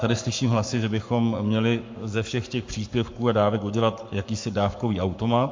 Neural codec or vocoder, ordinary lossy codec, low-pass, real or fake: codec, 44.1 kHz, 7.8 kbps, Pupu-Codec; MP3, 48 kbps; 7.2 kHz; fake